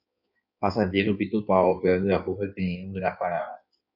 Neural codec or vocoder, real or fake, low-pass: codec, 16 kHz in and 24 kHz out, 1.1 kbps, FireRedTTS-2 codec; fake; 5.4 kHz